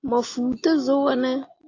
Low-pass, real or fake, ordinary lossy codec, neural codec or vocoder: 7.2 kHz; real; AAC, 32 kbps; none